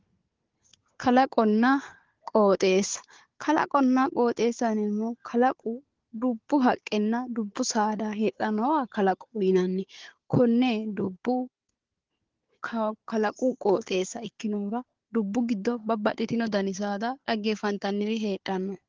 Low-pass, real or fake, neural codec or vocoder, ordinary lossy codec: 7.2 kHz; fake; codec, 16 kHz, 4 kbps, FunCodec, trained on Chinese and English, 50 frames a second; Opus, 16 kbps